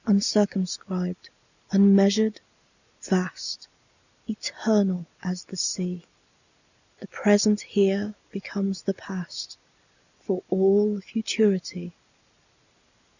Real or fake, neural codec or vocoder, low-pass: real; none; 7.2 kHz